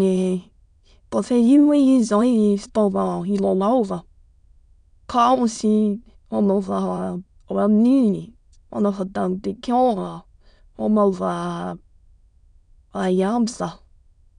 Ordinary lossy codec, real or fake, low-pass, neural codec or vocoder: none; fake; 9.9 kHz; autoencoder, 22.05 kHz, a latent of 192 numbers a frame, VITS, trained on many speakers